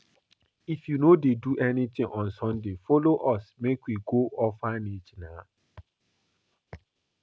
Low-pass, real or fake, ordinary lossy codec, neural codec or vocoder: none; real; none; none